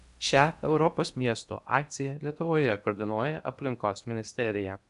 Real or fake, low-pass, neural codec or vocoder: fake; 10.8 kHz; codec, 16 kHz in and 24 kHz out, 0.8 kbps, FocalCodec, streaming, 65536 codes